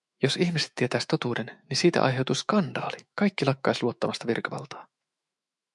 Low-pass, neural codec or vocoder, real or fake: 10.8 kHz; autoencoder, 48 kHz, 128 numbers a frame, DAC-VAE, trained on Japanese speech; fake